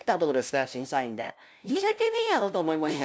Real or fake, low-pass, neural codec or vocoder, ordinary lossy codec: fake; none; codec, 16 kHz, 0.5 kbps, FunCodec, trained on LibriTTS, 25 frames a second; none